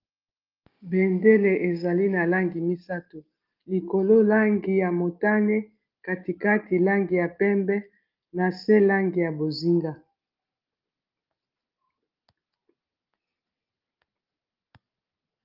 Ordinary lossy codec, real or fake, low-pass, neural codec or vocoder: Opus, 32 kbps; real; 5.4 kHz; none